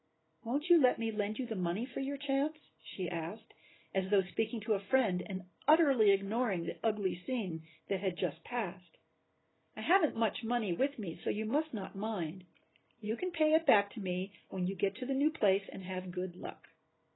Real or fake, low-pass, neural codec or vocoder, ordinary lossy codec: real; 7.2 kHz; none; AAC, 16 kbps